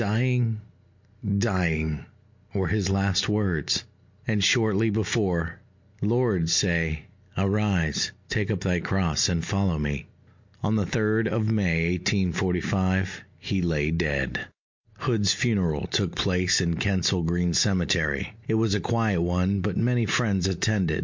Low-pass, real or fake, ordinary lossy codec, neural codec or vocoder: 7.2 kHz; real; MP3, 48 kbps; none